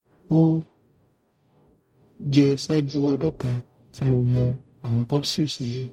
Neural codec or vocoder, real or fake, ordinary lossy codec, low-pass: codec, 44.1 kHz, 0.9 kbps, DAC; fake; MP3, 64 kbps; 19.8 kHz